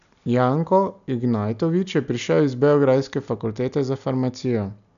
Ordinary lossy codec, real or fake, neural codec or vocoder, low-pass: none; real; none; 7.2 kHz